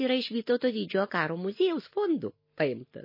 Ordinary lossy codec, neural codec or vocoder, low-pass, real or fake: MP3, 24 kbps; none; 5.4 kHz; real